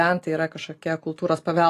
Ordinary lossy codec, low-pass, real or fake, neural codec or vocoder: AAC, 48 kbps; 14.4 kHz; real; none